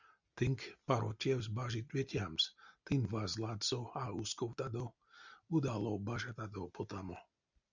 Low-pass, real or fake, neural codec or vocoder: 7.2 kHz; real; none